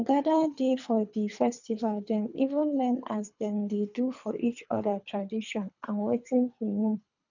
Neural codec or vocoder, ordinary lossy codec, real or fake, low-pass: codec, 24 kHz, 3 kbps, HILCodec; none; fake; 7.2 kHz